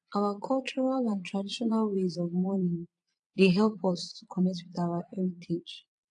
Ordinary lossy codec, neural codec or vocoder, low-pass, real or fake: AAC, 64 kbps; vocoder, 22.05 kHz, 80 mel bands, Vocos; 9.9 kHz; fake